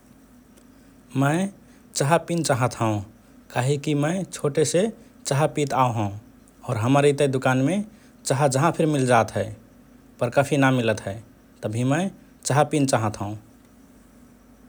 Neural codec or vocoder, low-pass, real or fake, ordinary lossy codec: none; none; real; none